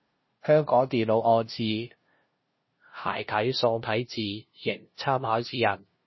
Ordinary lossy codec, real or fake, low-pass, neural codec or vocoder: MP3, 24 kbps; fake; 7.2 kHz; codec, 16 kHz, 0.5 kbps, FunCodec, trained on LibriTTS, 25 frames a second